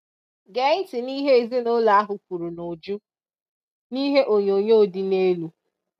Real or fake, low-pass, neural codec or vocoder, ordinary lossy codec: real; 14.4 kHz; none; none